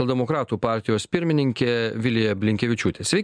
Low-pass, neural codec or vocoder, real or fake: 9.9 kHz; none; real